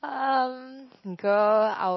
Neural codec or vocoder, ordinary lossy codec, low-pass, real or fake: none; MP3, 24 kbps; 7.2 kHz; real